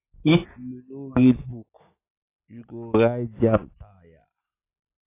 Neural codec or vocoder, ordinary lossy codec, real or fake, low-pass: none; AAC, 24 kbps; real; 3.6 kHz